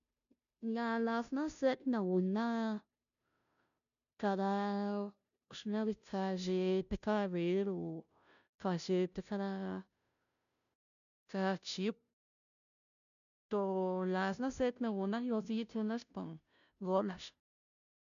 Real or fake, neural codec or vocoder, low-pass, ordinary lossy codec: fake; codec, 16 kHz, 0.5 kbps, FunCodec, trained on Chinese and English, 25 frames a second; 7.2 kHz; none